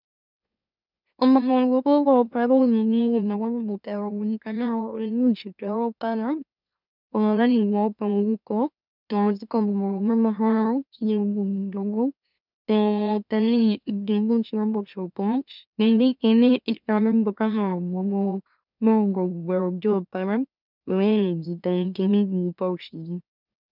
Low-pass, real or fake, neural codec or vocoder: 5.4 kHz; fake; autoencoder, 44.1 kHz, a latent of 192 numbers a frame, MeloTTS